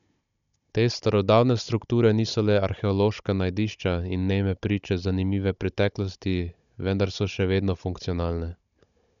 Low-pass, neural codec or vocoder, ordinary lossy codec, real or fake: 7.2 kHz; codec, 16 kHz, 16 kbps, FunCodec, trained on Chinese and English, 50 frames a second; none; fake